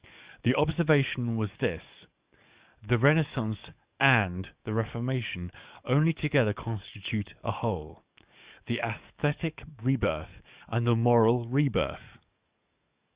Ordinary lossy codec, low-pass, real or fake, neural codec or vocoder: Opus, 64 kbps; 3.6 kHz; fake; codec, 44.1 kHz, 7.8 kbps, DAC